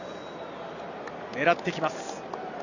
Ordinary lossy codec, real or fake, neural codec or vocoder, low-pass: none; real; none; 7.2 kHz